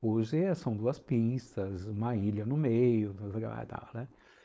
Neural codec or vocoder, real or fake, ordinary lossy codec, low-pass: codec, 16 kHz, 4.8 kbps, FACodec; fake; none; none